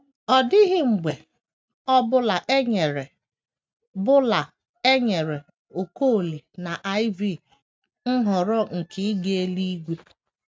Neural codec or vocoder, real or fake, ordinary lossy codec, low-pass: none; real; none; none